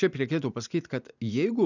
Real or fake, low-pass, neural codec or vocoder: real; 7.2 kHz; none